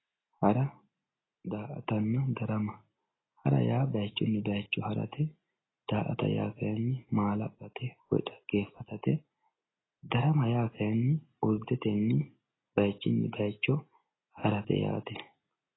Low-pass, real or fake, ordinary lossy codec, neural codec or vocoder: 7.2 kHz; real; AAC, 16 kbps; none